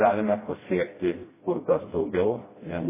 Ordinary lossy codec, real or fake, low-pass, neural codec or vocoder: MP3, 16 kbps; fake; 3.6 kHz; codec, 16 kHz, 1 kbps, FreqCodec, smaller model